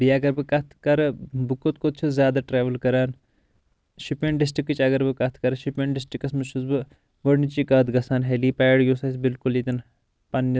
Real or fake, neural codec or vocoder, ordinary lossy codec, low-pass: real; none; none; none